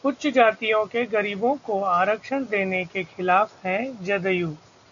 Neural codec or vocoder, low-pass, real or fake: none; 7.2 kHz; real